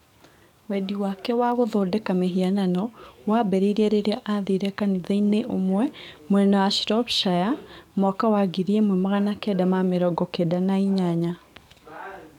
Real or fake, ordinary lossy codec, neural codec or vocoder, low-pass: fake; none; codec, 44.1 kHz, 7.8 kbps, DAC; 19.8 kHz